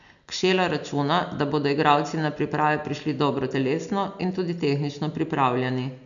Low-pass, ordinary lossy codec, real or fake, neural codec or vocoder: 7.2 kHz; none; real; none